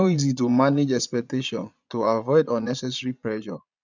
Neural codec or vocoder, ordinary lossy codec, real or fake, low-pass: vocoder, 22.05 kHz, 80 mel bands, Vocos; none; fake; 7.2 kHz